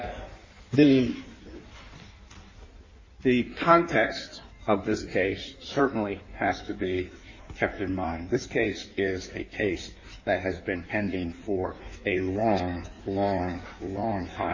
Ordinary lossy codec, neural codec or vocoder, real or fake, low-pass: MP3, 32 kbps; codec, 16 kHz in and 24 kHz out, 1.1 kbps, FireRedTTS-2 codec; fake; 7.2 kHz